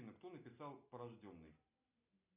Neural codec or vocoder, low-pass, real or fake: none; 3.6 kHz; real